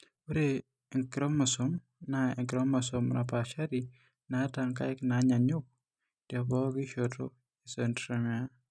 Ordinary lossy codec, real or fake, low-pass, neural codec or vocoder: none; real; none; none